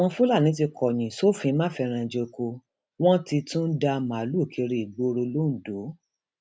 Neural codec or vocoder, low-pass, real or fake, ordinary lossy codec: none; none; real; none